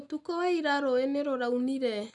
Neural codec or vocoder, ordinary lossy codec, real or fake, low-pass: none; none; real; 10.8 kHz